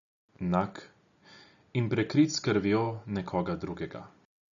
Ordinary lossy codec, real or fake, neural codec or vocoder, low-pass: none; real; none; 7.2 kHz